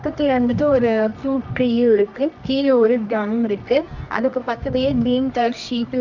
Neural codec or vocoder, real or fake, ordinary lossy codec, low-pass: codec, 24 kHz, 0.9 kbps, WavTokenizer, medium music audio release; fake; none; 7.2 kHz